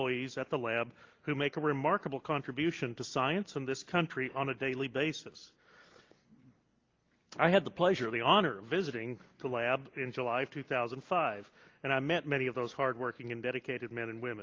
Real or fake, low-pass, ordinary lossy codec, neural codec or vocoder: real; 7.2 kHz; Opus, 32 kbps; none